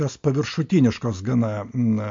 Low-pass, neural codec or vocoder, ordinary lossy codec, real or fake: 7.2 kHz; none; MP3, 48 kbps; real